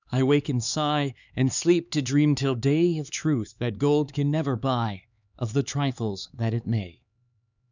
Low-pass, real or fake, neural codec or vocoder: 7.2 kHz; fake; codec, 16 kHz, 4 kbps, X-Codec, HuBERT features, trained on LibriSpeech